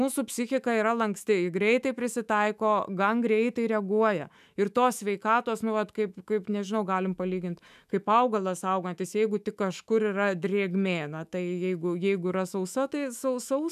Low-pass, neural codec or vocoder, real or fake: 14.4 kHz; autoencoder, 48 kHz, 128 numbers a frame, DAC-VAE, trained on Japanese speech; fake